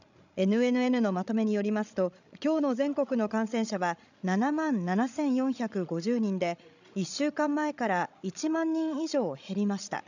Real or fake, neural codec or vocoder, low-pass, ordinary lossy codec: fake; codec, 16 kHz, 16 kbps, FreqCodec, larger model; 7.2 kHz; none